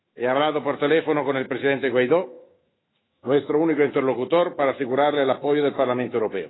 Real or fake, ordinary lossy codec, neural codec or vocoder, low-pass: fake; AAC, 16 kbps; autoencoder, 48 kHz, 128 numbers a frame, DAC-VAE, trained on Japanese speech; 7.2 kHz